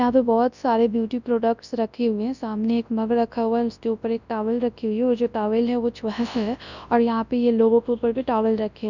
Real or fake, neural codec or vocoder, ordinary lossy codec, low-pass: fake; codec, 24 kHz, 0.9 kbps, WavTokenizer, large speech release; none; 7.2 kHz